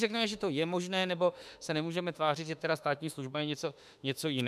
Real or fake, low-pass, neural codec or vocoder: fake; 14.4 kHz; autoencoder, 48 kHz, 32 numbers a frame, DAC-VAE, trained on Japanese speech